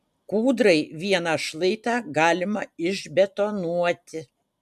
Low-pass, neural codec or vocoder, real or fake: 14.4 kHz; none; real